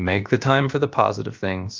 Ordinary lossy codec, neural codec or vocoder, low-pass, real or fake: Opus, 24 kbps; codec, 16 kHz, about 1 kbps, DyCAST, with the encoder's durations; 7.2 kHz; fake